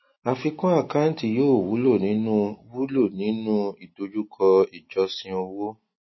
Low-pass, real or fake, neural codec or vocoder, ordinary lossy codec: 7.2 kHz; real; none; MP3, 24 kbps